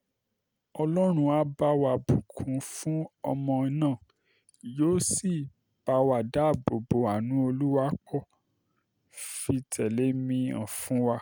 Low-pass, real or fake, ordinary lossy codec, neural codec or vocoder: none; real; none; none